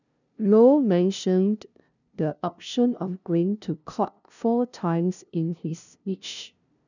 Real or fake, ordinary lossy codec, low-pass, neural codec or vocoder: fake; none; 7.2 kHz; codec, 16 kHz, 0.5 kbps, FunCodec, trained on LibriTTS, 25 frames a second